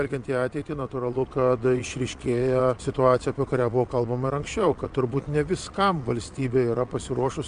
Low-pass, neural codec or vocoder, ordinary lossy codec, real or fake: 9.9 kHz; vocoder, 22.05 kHz, 80 mel bands, Vocos; MP3, 64 kbps; fake